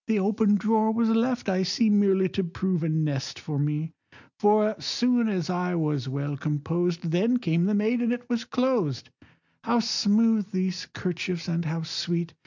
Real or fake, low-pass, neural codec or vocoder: real; 7.2 kHz; none